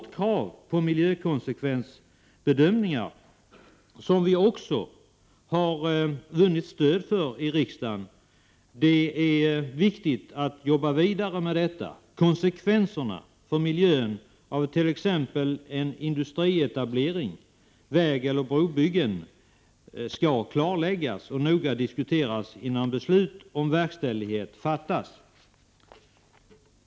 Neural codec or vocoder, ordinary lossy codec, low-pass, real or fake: none; none; none; real